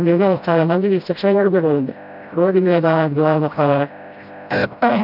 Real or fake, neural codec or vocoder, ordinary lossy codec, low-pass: fake; codec, 16 kHz, 0.5 kbps, FreqCodec, smaller model; none; 5.4 kHz